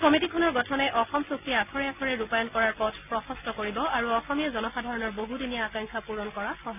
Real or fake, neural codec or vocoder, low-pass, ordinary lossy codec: real; none; 3.6 kHz; none